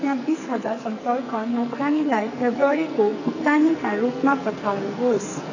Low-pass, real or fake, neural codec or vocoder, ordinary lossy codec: 7.2 kHz; fake; codec, 44.1 kHz, 2.6 kbps, SNAC; none